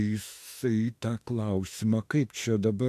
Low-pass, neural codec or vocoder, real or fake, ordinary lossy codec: 14.4 kHz; autoencoder, 48 kHz, 32 numbers a frame, DAC-VAE, trained on Japanese speech; fake; AAC, 64 kbps